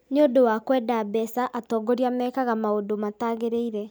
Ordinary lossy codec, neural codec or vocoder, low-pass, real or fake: none; none; none; real